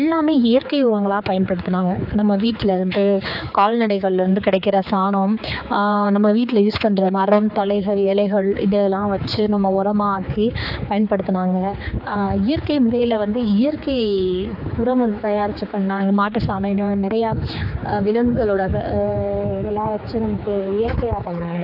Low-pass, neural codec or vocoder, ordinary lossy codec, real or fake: 5.4 kHz; codec, 16 kHz, 4 kbps, X-Codec, HuBERT features, trained on general audio; AAC, 48 kbps; fake